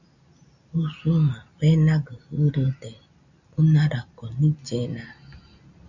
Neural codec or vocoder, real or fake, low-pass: none; real; 7.2 kHz